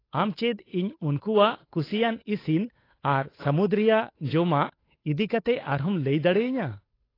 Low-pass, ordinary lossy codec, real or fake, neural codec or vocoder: 5.4 kHz; AAC, 24 kbps; fake; codec, 16 kHz, 8 kbps, FunCodec, trained on Chinese and English, 25 frames a second